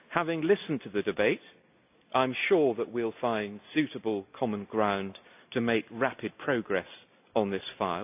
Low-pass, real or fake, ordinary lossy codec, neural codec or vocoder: 3.6 kHz; real; AAC, 32 kbps; none